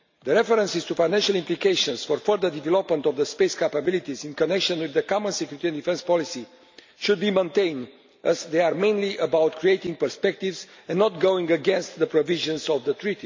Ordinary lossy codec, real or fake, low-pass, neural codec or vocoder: MP3, 64 kbps; real; 7.2 kHz; none